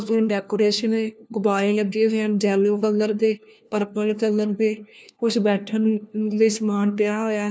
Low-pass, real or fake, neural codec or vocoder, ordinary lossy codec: none; fake; codec, 16 kHz, 1 kbps, FunCodec, trained on LibriTTS, 50 frames a second; none